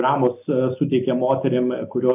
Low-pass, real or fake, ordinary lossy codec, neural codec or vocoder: 3.6 kHz; real; AAC, 32 kbps; none